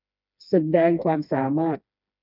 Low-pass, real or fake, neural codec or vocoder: 5.4 kHz; fake; codec, 16 kHz, 2 kbps, FreqCodec, smaller model